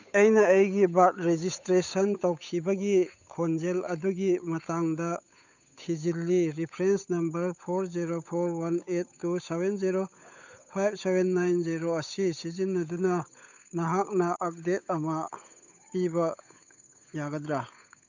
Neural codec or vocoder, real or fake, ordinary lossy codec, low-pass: codec, 16 kHz, 8 kbps, FunCodec, trained on Chinese and English, 25 frames a second; fake; none; 7.2 kHz